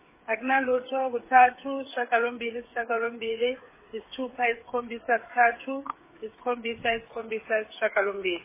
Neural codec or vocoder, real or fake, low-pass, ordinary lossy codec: codec, 16 kHz, 8 kbps, FreqCodec, smaller model; fake; 3.6 kHz; MP3, 16 kbps